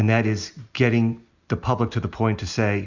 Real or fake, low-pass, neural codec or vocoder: real; 7.2 kHz; none